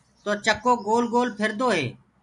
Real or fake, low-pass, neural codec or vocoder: real; 10.8 kHz; none